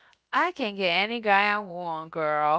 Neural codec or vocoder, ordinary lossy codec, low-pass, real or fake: codec, 16 kHz, 0.7 kbps, FocalCodec; none; none; fake